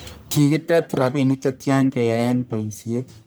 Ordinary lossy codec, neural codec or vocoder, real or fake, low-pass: none; codec, 44.1 kHz, 1.7 kbps, Pupu-Codec; fake; none